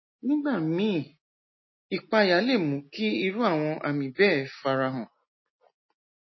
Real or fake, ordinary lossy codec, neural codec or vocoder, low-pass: real; MP3, 24 kbps; none; 7.2 kHz